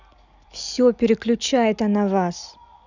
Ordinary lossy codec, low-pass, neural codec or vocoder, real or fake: none; 7.2 kHz; none; real